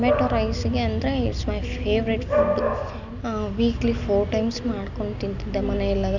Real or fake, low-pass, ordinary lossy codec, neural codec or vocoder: real; 7.2 kHz; none; none